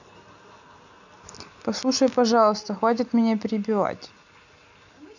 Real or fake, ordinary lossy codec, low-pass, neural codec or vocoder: real; none; 7.2 kHz; none